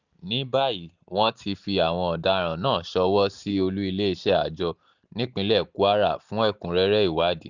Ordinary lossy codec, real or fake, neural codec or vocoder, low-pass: none; real; none; 7.2 kHz